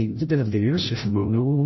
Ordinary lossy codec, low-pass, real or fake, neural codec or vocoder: MP3, 24 kbps; 7.2 kHz; fake; codec, 16 kHz, 0.5 kbps, FreqCodec, larger model